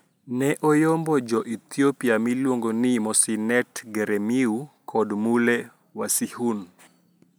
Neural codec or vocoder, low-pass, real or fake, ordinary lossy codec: none; none; real; none